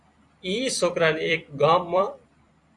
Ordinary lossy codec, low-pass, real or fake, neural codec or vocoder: Opus, 64 kbps; 10.8 kHz; fake; vocoder, 44.1 kHz, 128 mel bands every 256 samples, BigVGAN v2